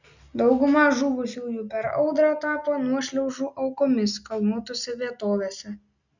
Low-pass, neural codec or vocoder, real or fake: 7.2 kHz; none; real